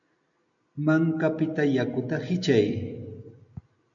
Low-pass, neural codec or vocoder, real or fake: 7.2 kHz; none; real